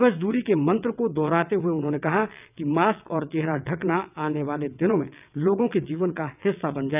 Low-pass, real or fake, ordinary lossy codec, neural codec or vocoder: 3.6 kHz; fake; none; vocoder, 22.05 kHz, 80 mel bands, WaveNeXt